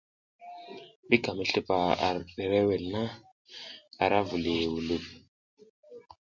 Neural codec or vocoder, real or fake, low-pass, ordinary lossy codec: none; real; 7.2 kHz; MP3, 64 kbps